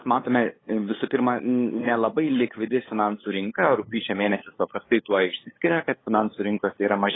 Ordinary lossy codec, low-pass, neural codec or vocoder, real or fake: AAC, 16 kbps; 7.2 kHz; codec, 16 kHz, 8 kbps, FunCodec, trained on LibriTTS, 25 frames a second; fake